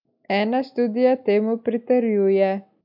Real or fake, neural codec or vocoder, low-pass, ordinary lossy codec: real; none; 5.4 kHz; none